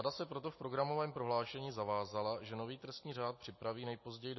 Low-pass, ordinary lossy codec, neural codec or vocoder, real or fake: 7.2 kHz; MP3, 24 kbps; none; real